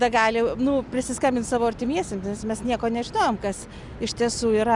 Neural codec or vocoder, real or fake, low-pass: none; real; 10.8 kHz